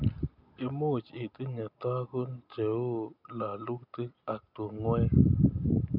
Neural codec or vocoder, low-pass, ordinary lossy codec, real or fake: none; 5.4 kHz; none; real